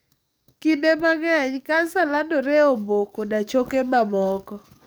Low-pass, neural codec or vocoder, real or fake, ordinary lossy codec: none; codec, 44.1 kHz, 7.8 kbps, DAC; fake; none